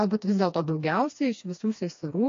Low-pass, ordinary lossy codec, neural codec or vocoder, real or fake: 7.2 kHz; AAC, 48 kbps; codec, 16 kHz, 2 kbps, FreqCodec, smaller model; fake